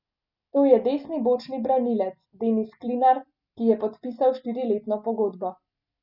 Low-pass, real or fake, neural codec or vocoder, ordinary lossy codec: 5.4 kHz; real; none; none